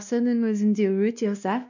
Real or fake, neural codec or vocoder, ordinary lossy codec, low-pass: fake; codec, 16 kHz, 1 kbps, X-Codec, WavLM features, trained on Multilingual LibriSpeech; none; 7.2 kHz